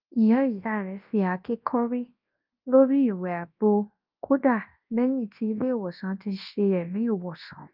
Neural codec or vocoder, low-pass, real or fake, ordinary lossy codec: codec, 24 kHz, 0.9 kbps, WavTokenizer, large speech release; 5.4 kHz; fake; Opus, 24 kbps